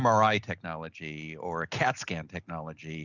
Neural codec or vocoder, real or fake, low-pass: none; real; 7.2 kHz